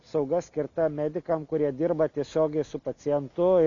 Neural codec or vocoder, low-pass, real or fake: none; 7.2 kHz; real